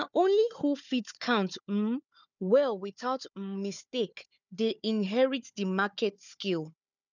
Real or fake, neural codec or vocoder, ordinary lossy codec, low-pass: fake; codec, 16 kHz, 4 kbps, FunCodec, trained on Chinese and English, 50 frames a second; none; 7.2 kHz